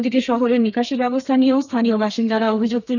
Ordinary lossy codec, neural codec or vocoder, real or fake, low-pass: none; codec, 32 kHz, 1.9 kbps, SNAC; fake; 7.2 kHz